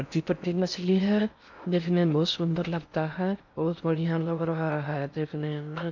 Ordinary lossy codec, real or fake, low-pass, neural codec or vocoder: none; fake; 7.2 kHz; codec, 16 kHz in and 24 kHz out, 0.6 kbps, FocalCodec, streaming, 4096 codes